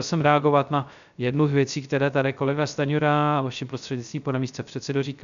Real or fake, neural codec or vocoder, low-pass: fake; codec, 16 kHz, 0.3 kbps, FocalCodec; 7.2 kHz